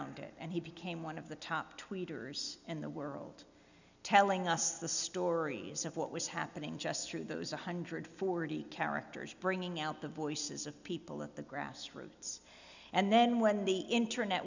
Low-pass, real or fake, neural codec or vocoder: 7.2 kHz; real; none